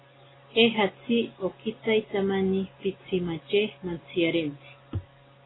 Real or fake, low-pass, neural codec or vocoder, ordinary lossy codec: real; 7.2 kHz; none; AAC, 16 kbps